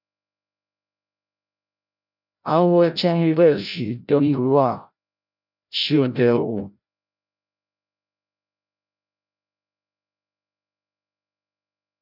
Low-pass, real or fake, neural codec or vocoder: 5.4 kHz; fake; codec, 16 kHz, 0.5 kbps, FreqCodec, larger model